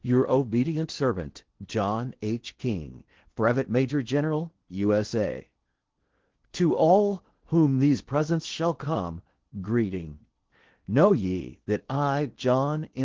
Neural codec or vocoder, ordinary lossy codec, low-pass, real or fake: codec, 16 kHz in and 24 kHz out, 0.8 kbps, FocalCodec, streaming, 65536 codes; Opus, 16 kbps; 7.2 kHz; fake